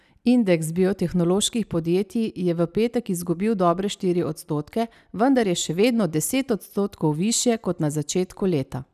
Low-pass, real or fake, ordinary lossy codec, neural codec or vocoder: 14.4 kHz; real; none; none